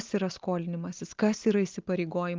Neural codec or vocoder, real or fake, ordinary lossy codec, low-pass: none; real; Opus, 32 kbps; 7.2 kHz